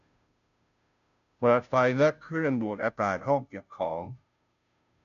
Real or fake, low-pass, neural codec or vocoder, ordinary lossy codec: fake; 7.2 kHz; codec, 16 kHz, 0.5 kbps, FunCodec, trained on Chinese and English, 25 frames a second; none